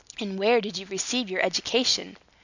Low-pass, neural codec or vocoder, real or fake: 7.2 kHz; none; real